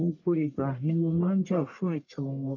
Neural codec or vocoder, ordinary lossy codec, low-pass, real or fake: codec, 44.1 kHz, 1.7 kbps, Pupu-Codec; none; 7.2 kHz; fake